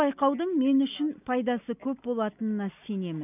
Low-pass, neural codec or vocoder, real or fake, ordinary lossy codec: 3.6 kHz; none; real; none